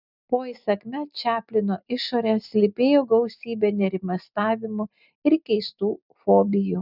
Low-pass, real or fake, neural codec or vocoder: 5.4 kHz; real; none